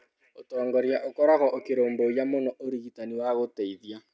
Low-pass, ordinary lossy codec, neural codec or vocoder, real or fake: none; none; none; real